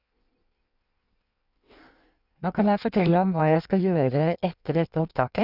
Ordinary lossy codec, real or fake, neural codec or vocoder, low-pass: none; fake; codec, 16 kHz in and 24 kHz out, 1.1 kbps, FireRedTTS-2 codec; 5.4 kHz